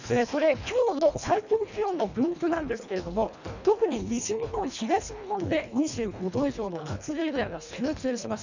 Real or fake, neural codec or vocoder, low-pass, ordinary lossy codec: fake; codec, 24 kHz, 1.5 kbps, HILCodec; 7.2 kHz; none